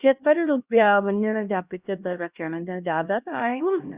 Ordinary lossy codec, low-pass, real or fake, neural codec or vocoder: Opus, 64 kbps; 3.6 kHz; fake; codec, 16 kHz, 1 kbps, X-Codec, HuBERT features, trained on LibriSpeech